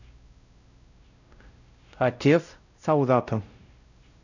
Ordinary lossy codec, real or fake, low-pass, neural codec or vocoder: none; fake; 7.2 kHz; codec, 16 kHz, 0.5 kbps, X-Codec, WavLM features, trained on Multilingual LibriSpeech